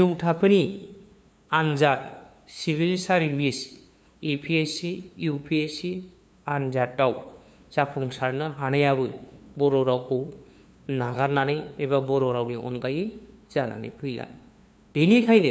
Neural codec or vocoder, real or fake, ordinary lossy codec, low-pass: codec, 16 kHz, 2 kbps, FunCodec, trained on LibriTTS, 25 frames a second; fake; none; none